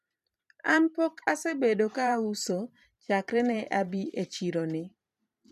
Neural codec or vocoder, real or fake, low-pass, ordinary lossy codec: vocoder, 44.1 kHz, 128 mel bands every 512 samples, BigVGAN v2; fake; 14.4 kHz; none